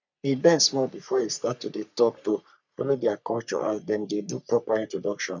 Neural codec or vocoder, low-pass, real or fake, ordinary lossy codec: codec, 44.1 kHz, 3.4 kbps, Pupu-Codec; 7.2 kHz; fake; none